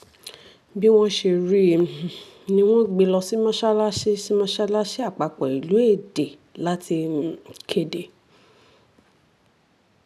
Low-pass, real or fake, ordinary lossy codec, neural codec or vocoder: 14.4 kHz; real; none; none